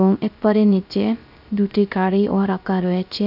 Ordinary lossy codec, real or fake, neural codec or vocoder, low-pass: none; fake; codec, 16 kHz, 0.3 kbps, FocalCodec; 5.4 kHz